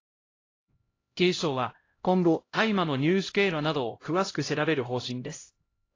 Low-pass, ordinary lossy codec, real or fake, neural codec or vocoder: 7.2 kHz; AAC, 32 kbps; fake; codec, 16 kHz, 0.5 kbps, X-Codec, HuBERT features, trained on LibriSpeech